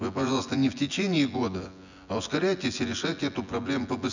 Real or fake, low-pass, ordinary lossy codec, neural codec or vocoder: fake; 7.2 kHz; none; vocoder, 24 kHz, 100 mel bands, Vocos